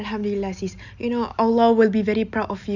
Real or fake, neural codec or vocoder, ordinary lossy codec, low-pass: real; none; none; 7.2 kHz